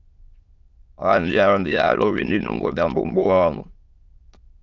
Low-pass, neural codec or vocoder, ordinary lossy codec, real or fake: 7.2 kHz; autoencoder, 22.05 kHz, a latent of 192 numbers a frame, VITS, trained on many speakers; Opus, 24 kbps; fake